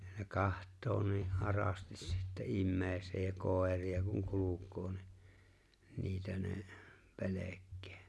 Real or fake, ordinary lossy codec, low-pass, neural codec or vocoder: real; none; none; none